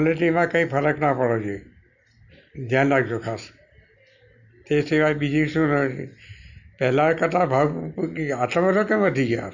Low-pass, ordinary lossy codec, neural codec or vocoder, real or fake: 7.2 kHz; none; none; real